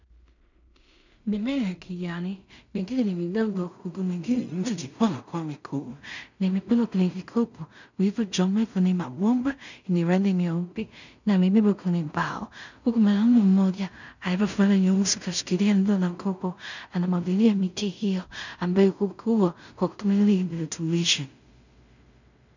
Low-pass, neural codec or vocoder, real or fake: 7.2 kHz; codec, 16 kHz in and 24 kHz out, 0.4 kbps, LongCat-Audio-Codec, two codebook decoder; fake